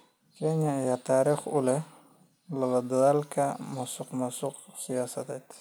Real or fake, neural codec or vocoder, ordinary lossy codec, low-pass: real; none; none; none